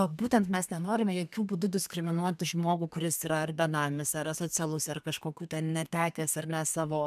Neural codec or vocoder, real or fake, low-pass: codec, 32 kHz, 1.9 kbps, SNAC; fake; 14.4 kHz